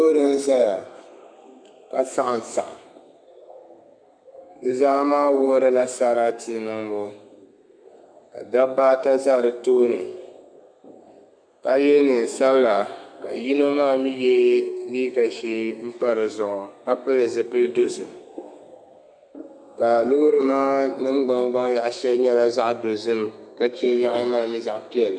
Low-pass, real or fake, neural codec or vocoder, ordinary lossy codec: 9.9 kHz; fake; codec, 32 kHz, 1.9 kbps, SNAC; MP3, 96 kbps